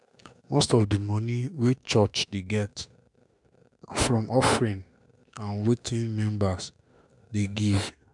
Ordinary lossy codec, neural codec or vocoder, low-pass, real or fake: none; autoencoder, 48 kHz, 32 numbers a frame, DAC-VAE, trained on Japanese speech; 10.8 kHz; fake